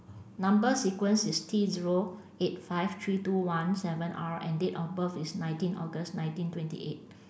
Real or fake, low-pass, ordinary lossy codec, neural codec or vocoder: real; none; none; none